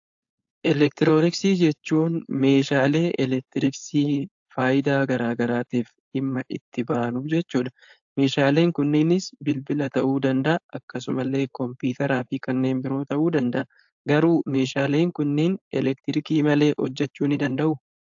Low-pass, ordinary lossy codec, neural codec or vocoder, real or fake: 7.2 kHz; AAC, 64 kbps; codec, 16 kHz, 4.8 kbps, FACodec; fake